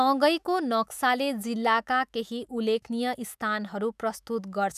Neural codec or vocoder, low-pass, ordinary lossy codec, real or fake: autoencoder, 48 kHz, 128 numbers a frame, DAC-VAE, trained on Japanese speech; 14.4 kHz; none; fake